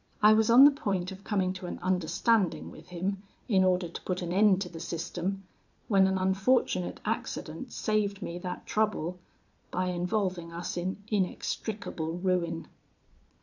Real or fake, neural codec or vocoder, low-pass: fake; vocoder, 22.05 kHz, 80 mel bands, Vocos; 7.2 kHz